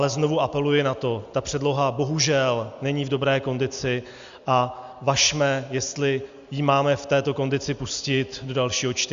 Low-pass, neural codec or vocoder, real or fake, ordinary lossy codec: 7.2 kHz; none; real; Opus, 64 kbps